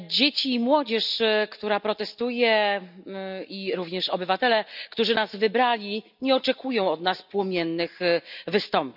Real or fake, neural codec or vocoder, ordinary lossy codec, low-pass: real; none; none; 5.4 kHz